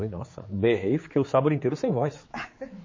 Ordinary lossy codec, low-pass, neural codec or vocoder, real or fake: MP3, 32 kbps; 7.2 kHz; codec, 16 kHz, 4 kbps, X-Codec, HuBERT features, trained on general audio; fake